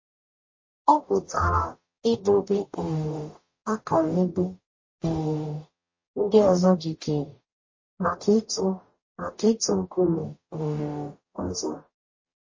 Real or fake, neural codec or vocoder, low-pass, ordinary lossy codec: fake; codec, 44.1 kHz, 0.9 kbps, DAC; 7.2 kHz; MP3, 32 kbps